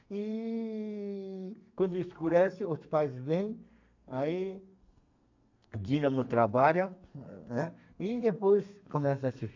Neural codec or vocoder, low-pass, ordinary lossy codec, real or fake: codec, 32 kHz, 1.9 kbps, SNAC; 7.2 kHz; AAC, 48 kbps; fake